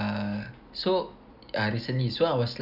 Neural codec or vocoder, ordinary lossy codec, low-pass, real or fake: none; none; 5.4 kHz; real